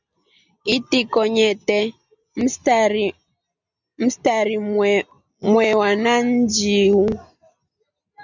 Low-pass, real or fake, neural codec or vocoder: 7.2 kHz; real; none